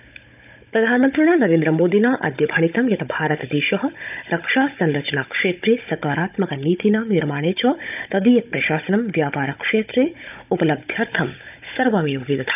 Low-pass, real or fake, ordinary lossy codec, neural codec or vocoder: 3.6 kHz; fake; none; codec, 16 kHz, 16 kbps, FunCodec, trained on Chinese and English, 50 frames a second